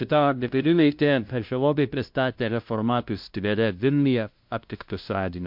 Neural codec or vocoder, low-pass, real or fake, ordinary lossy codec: codec, 16 kHz, 0.5 kbps, FunCodec, trained on LibriTTS, 25 frames a second; 5.4 kHz; fake; MP3, 48 kbps